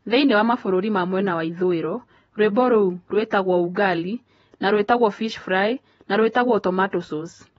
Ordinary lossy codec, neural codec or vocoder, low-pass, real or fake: AAC, 24 kbps; vocoder, 48 kHz, 128 mel bands, Vocos; 19.8 kHz; fake